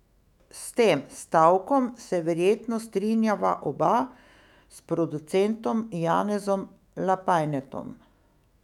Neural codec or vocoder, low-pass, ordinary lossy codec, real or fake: autoencoder, 48 kHz, 128 numbers a frame, DAC-VAE, trained on Japanese speech; 19.8 kHz; none; fake